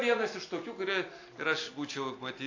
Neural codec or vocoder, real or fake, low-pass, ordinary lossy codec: none; real; 7.2 kHz; AAC, 48 kbps